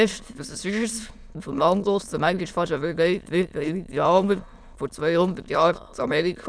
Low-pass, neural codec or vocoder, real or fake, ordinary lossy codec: none; autoencoder, 22.05 kHz, a latent of 192 numbers a frame, VITS, trained on many speakers; fake; none